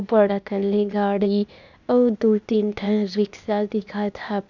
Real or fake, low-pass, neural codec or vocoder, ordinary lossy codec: fake; 7.2 kHz; codec, 16 kHz, 0.8 kbps, ZipCodec; none